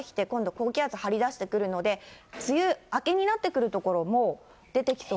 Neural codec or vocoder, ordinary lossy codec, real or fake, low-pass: none; none; real; none